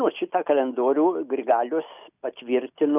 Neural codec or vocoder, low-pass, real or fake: none; 3.6 kHz; real